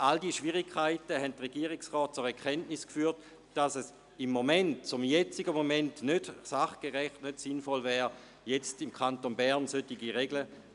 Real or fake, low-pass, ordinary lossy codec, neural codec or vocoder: real; 10.8 kHz; none; none